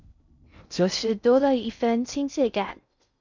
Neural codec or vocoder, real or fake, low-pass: codec, 16 kHz in and 24 kHz out, 0.6 kbps, FocalCodec, streaming, 2048 codes; fake; 7.2 kHz